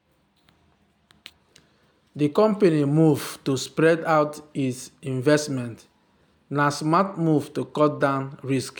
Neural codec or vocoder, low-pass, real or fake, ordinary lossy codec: none; 19.8 kHz; real; none